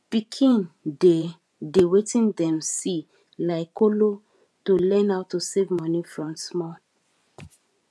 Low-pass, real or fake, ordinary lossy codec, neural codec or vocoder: none; fake; none; vocoder, 24 kHz, 100 mel bands, Vocos